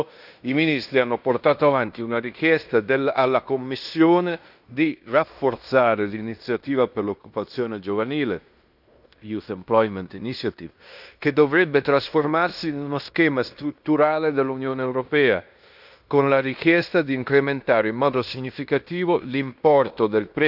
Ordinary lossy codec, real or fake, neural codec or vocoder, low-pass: none; fake; codec, 16 kHz in and 24 kHz out, 0.9 kbps, LongCat-Audio-Codec, fine tuned four codebook decoder; 5.4 kHz